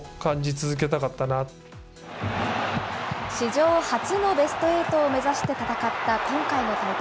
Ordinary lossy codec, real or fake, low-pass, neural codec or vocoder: none; real; none; none